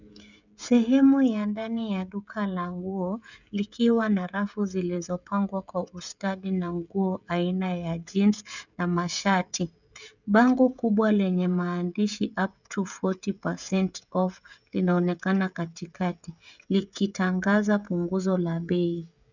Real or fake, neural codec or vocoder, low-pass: fake; codec, 16 kHz, 16 kbps, FreqCodec, smaller model; 7.2 kHz